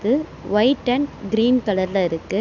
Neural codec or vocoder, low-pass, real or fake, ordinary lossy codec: none; 7.2 kHz; real; none